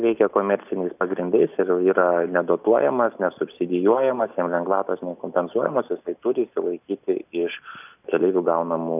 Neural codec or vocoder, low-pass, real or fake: none; 3.6 kHz; real